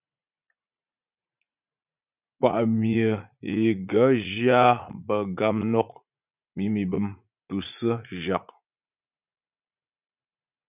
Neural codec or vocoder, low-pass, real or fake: vocoder, 44.1 kHz, 80 mel bands, Vocos; 3.6 kHz; fake